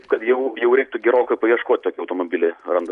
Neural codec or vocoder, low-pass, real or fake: none; 10.8 kHz; real